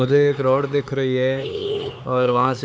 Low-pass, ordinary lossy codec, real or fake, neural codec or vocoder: none; none; fake; codec, 16 kHz, 4 kbps, X-Codec, HuBERT features, trained on LibriSpeech